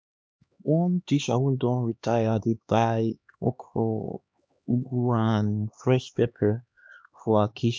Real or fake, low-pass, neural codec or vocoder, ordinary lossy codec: fake; none; codec, 16 kHz, 2 kbps, X-Codec, HuBERT features, trained on LibriSpeech; none